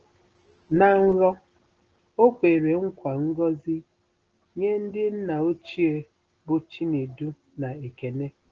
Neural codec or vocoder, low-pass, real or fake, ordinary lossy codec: none; 7.2 kHz; real; Opus, 16 kbps